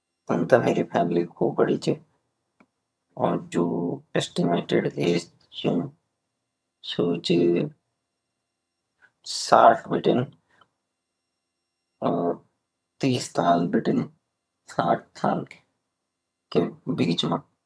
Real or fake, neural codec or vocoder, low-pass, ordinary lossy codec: fake; vocoder, 22.05 kHz, 80 mel bands, HiFi-GAN; none; none